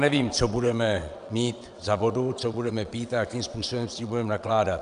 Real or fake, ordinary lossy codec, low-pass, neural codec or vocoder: fake; MP3, 96 kbps; 9.9 kHz; vocoder, 22.05 kHz, 80 mel bands, WaveNeXt